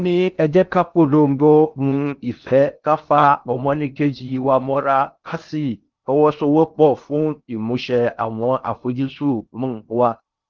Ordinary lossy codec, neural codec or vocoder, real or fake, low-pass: Opus, 24 kbps; codec, 16 kHz in and 24 kHz out, 0.6 kbps, FocalCodec, streaming, 2048 codes; fake; 7.2 kHz